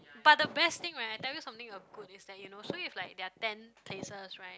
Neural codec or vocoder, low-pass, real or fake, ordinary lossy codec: none; none; real; none